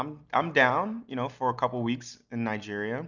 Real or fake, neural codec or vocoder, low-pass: real; none; 7.2 kHz